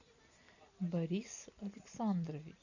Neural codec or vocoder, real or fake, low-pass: none; real; 7.2 kHz